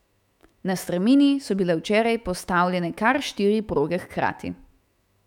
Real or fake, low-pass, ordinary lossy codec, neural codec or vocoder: fake; 19.8 kHz; none; autoencoder, 48 kHz, 128 numbers a frame, DAC-VAE, trained on Japanese speech